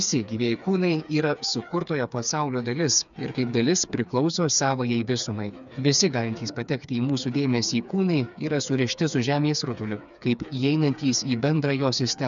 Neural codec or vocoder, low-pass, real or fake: codec, 16 kHz, 4 kbps, FreqCodec, smaller model; 7.2 kHz; fake